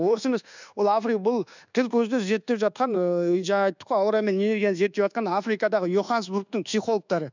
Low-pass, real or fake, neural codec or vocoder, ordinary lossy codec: 7.2 kHz; fake; codec, 24 kHz, 1.2 kbps, DualCodec; none